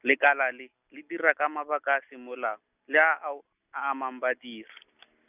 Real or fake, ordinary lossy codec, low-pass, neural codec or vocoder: real; none; 3.6 kHz; none